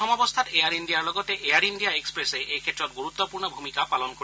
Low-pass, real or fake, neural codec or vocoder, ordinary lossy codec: none; real; none; none